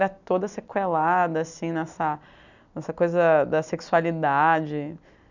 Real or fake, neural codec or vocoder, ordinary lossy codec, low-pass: real; none; none; 7.2 kHz